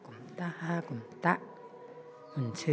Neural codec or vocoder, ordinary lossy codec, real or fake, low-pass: none; none; real; none